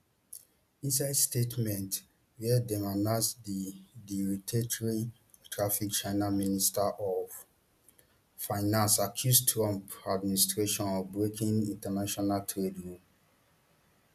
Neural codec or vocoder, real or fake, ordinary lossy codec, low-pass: none; real; none; 14.4 kHz